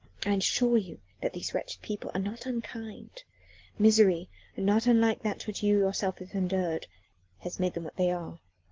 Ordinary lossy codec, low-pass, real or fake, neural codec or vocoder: Opus, 16 kbps; 7.2 kHz; real; none